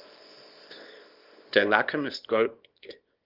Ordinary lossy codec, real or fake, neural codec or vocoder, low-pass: Opus, 32 kbps; fake; codec, 24 kHz, 0.9 kbps, WavTokenizer, small release; 5.4 kHz